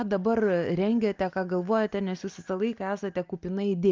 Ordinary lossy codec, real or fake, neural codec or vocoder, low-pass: Opus, 24 kbps; real; none; 7.2 kHz